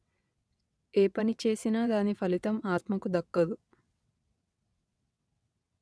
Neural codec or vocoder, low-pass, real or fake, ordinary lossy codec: vocoder, 22.05 kHz, 80 mel bands, WaveNeXt; none; fake; none